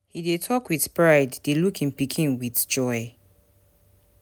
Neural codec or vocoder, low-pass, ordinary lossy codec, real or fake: none; none; none; real